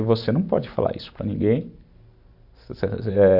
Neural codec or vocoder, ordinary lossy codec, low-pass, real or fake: none; AAC, 48 kbps; 5.4 kHz; real